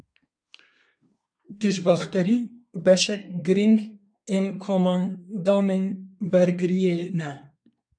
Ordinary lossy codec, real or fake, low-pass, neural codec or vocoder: AAC, 64 kbps; fake; 9.9 kHz; codec, 24 kHz, 1 kbps, SNAC